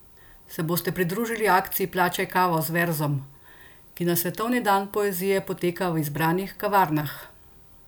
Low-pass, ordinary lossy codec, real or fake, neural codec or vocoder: none; none; real; none